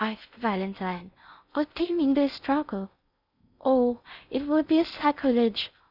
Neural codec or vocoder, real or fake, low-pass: codec, 16 kHz in and 24 kHz out, 0.6 kbps, FocalCodec, streaming, 4096 codes; fake; 5.4 kHz